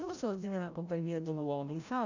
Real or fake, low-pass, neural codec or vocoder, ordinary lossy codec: fake; 7.2 kHz; codec, 16 kHz, 0.5 kbps, FreqCodec, larger model; none